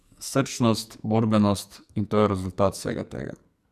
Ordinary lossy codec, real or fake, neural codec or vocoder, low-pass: none; fake; codec, 44.1 kHz, 2.6 kbps, SNAC; 14.4 kHz